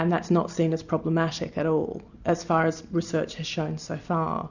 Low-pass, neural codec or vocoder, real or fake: 7.2 kHz; none; real